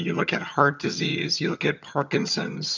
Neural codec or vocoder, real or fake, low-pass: vocoder, 22.05 kHz, 80 mel bands, HiFi-GAN; fake; 7.2 kHz